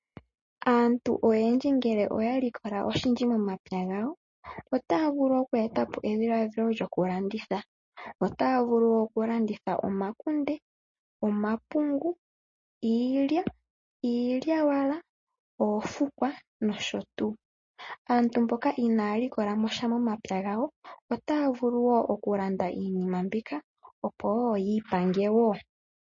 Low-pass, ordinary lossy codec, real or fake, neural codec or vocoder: 7.2 kHz; MP3, 32 kbps; real; none